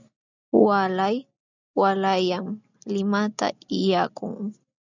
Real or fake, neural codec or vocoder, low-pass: real; none; 7.2 kHz